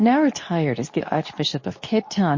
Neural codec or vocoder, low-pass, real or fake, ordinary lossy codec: codec, 24 kHz, 0.9 kbps, WavTokenizer, medium speech release version 2; 7.2 kHz; fake; MP3, 32 kbps